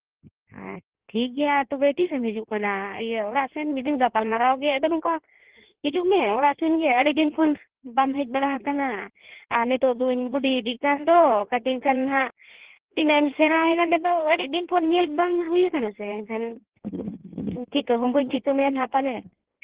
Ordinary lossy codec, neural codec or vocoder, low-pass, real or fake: Opus, 16 kbps; codec, 16 kHz in and 24 kHz out, 1.1 kbps, FireRedTTS-2 codec; 3.6 kHz; fake